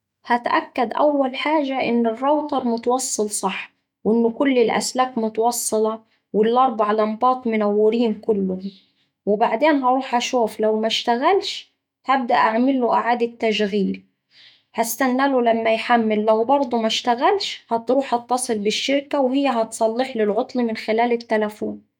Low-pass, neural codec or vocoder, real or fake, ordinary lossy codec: 19.8 kHz; autoencoder, 48 kHz, 128 numbers a frame, DAC-VAE, trained on Japanese speech; fake; none